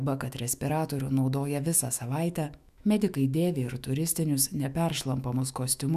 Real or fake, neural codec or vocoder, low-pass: fake; autoencoder, 48 kHz, 128 numbers a frame, DAC-VAE, trained on Japanese speech; 14.4 kHz